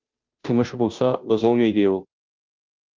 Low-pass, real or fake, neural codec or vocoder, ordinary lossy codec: 7.2 kHz; fake; codec, 16 kHz, 0.5 kbps, FunCodec, trained on Chinese and English, 25 frames a second; Opus, 32 kbps